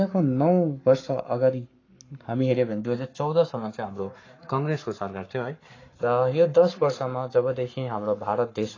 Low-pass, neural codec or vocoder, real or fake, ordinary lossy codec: 7.2 kHz; codec, 44.1 kHz, 7.8 kbps, Pupu-Codec; fake; AAC, 32 kbps